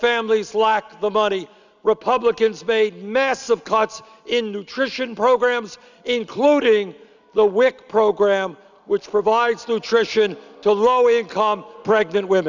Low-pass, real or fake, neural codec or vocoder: 7.2 kHz; real; none